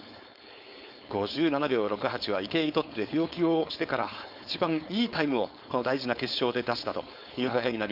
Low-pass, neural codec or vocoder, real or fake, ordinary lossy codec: 5.4 kHz; codec, 16 kHz, 4.8 kbps, FACodec; fake; Opus, 64 kbps